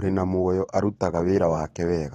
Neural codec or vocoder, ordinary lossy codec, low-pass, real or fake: vocoder, 44.1 kHz, 128 mel bands every 512 samples, BigVGAN v2; AAC, 32 kbps; 19.8 kHz; fake